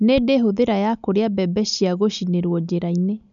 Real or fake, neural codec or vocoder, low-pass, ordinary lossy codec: real; none; 7.2 kHz; none